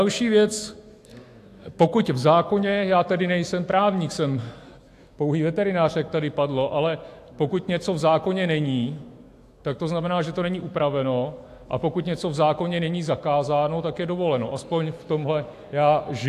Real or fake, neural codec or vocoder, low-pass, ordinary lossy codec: fake; autoencoder, 48 kHz, 128 numbers a frame, DAC-VAE, trained on Japanese speech; 14.4 kHz; AAC, 64 kbps